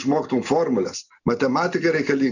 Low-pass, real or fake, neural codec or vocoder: 7.2 kHz; real; none